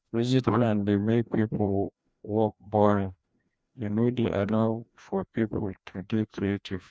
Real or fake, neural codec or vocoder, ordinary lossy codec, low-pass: fake; codec, 16 kHz, 1 kbps, FreqCodec, larger model; none; none